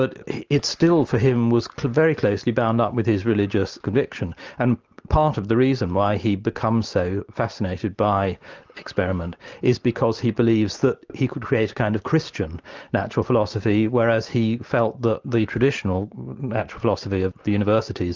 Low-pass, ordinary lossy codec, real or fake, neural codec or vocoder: 7.2 kHz; Opus, 24 kbps; real; none